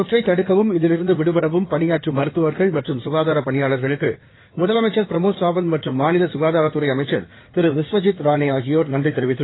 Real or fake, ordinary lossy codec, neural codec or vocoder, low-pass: fake; AAC, 16 kbps; codec, 16 kHz in and 24 kHz out, 2.2 kbps, FireRedTTS-2 codec; 7.2 kHz